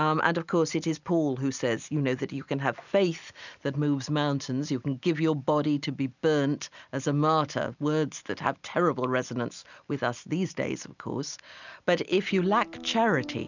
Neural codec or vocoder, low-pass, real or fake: none; 7.2 kHz; real